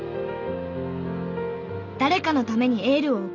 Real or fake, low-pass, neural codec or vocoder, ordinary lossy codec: real; 7.2 kHz; none; none